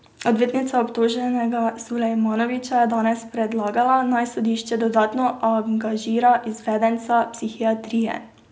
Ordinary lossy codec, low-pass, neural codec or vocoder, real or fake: none; none; none; real